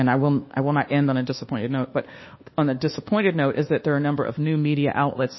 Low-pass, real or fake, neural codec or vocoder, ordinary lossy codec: 7.2 kHz; fake; codec, 24 kHz, 1.2 kbps, DualCodec; MP3, 24 kbps